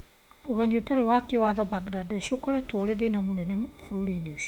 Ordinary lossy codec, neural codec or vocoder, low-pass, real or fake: MP3, 96 kbps; autoencoder, 48 kHz, 32 numbers a frame, DAC-VAE, trained on Japanese speech; 19.8 kHz; fake